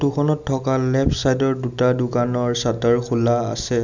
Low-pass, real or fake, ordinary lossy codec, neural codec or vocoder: 7.2 kHz; real; none; none